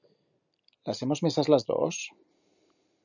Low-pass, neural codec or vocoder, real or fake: 7.2 kHz; none; real